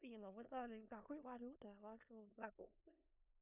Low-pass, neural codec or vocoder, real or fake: 3.6 kHz; codec, 16 kHz in and 24 kHz out, 0.4 kbps, LongCat-Audio-Codec, four codebook decoder; fake